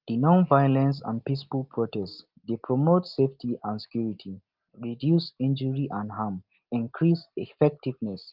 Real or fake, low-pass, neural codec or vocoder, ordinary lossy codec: real; 5.4 kHz; none; Opus, 32 kbps